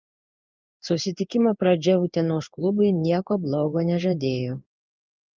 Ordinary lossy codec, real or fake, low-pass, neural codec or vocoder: Opus, 24 kbps; fake; 7.2 kHz; codec, 16 kHz in and 24 kHz out, 1 kbps, XY-Tokenizer